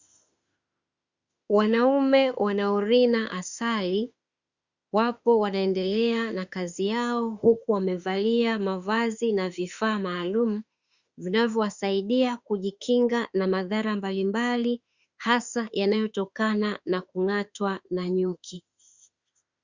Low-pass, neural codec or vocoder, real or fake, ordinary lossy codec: 7.2 kHz; autoencoder, 48 kHz, 32 numbers a frame, DAC-VAE, trained on Japanese speech; fake; Opus, 64 kbps